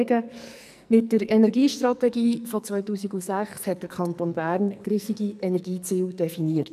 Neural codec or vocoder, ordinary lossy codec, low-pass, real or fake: codec, 44.1 kHz, 2.6 kbps, SNAC; none; 14.4 kHz; fake